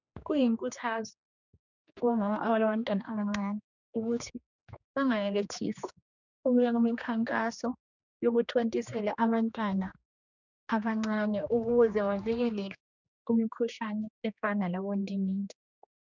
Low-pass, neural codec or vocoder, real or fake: 7.2 kHz; codec, 16 kHz, 2 kbps, X-Codec, HuBERT features, trained on general audio; fake